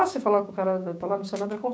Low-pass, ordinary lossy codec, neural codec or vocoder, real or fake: none; none; codec, 16 kHz, 6 kbps, DAC; fake